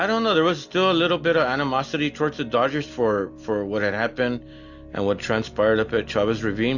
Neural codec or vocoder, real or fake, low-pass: none; real; 7.2 kHz